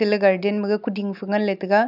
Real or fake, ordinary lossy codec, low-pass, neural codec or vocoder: real; none; 5.4 kHz; none